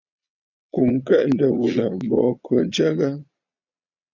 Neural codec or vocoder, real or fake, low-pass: vocoder, 22.05 kHz, 80 mel bands, Vocos; fake; 7.2 kHz